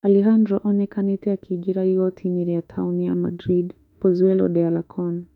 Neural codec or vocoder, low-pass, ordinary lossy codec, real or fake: autoencoder, 48 kHz, 32 numbers a frame, DAC-VAE, trained on Japanese speech; 19.8 kHz; none; fake